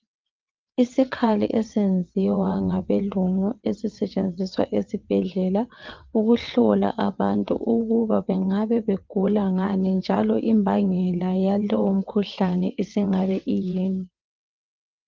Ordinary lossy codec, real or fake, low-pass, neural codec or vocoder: Opus, 24 kbps; fake; 7.2 kHz; vocoder, 22.05 kHz, 80 mel bands, WaveNeXt